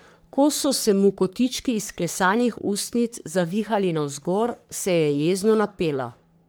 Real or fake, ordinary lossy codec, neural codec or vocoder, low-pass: fake; none; codec, 44.1 kHz, 3.4 kbps, Pupu-Codec; none